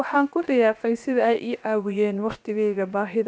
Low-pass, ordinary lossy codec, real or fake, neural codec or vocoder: none; none; fake; codec, 16 kHz, 0.7 kbps, FocalCodec